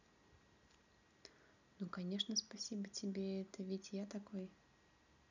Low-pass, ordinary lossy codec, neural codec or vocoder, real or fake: 7.2 kHz; none; none; real